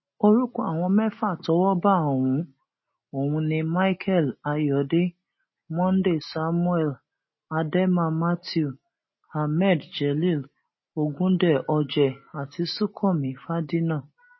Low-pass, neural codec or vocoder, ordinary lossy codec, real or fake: 7.2 kHz; none; MP3, 24 kbps; real